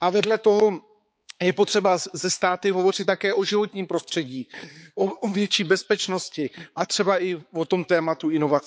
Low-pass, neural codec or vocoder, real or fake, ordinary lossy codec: none; codec, 16 kHz, 4 kbps, X-Codec, HuBERT features, trained on balanced general audio; fake; none